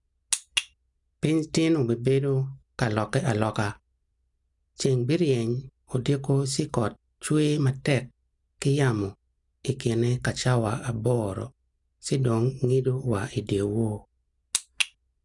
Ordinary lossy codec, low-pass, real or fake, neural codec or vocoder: none; 10.8 kHz; real; none